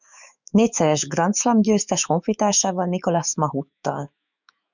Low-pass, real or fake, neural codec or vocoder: 7.2 kHz; fake; autoencoder, 48 kHz, 128 numbers a frame, DAC-VAE, trained on Japanese speech